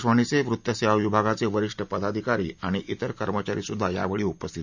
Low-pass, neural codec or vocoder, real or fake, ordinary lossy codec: 7.2 kHz; none; real; none